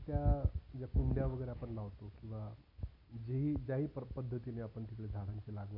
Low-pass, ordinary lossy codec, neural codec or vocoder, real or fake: 5.4 kHz; none; none; real